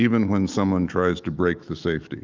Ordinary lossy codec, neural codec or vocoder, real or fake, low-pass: Opus, 32 kbps; autoencoder, 48 kHz, 128 numbers a frame, DAC-VAE, trained on Japanese speech; fake; 7.2 kHz